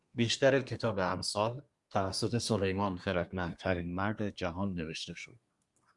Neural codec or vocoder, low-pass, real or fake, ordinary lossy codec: codec, 24 kHz, 1 kbps, SNAC; 10.8 kHz; fake; Opus, 64 kbps